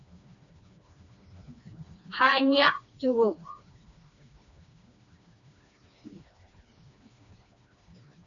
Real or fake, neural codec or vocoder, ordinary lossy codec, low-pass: fake; codec, 16 kHz, 2 kbps, FreqCodec, smaller model; MP3, 96 kbps; 7.2 kHz